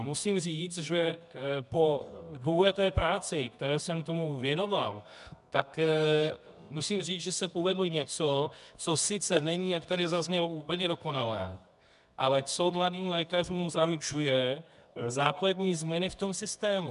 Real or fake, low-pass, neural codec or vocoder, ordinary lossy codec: fake; 10.8 kHz; codec, 24 kHz, 0.9 kbps, WavTokenizer, medium music audio release; MP3, 96 kbps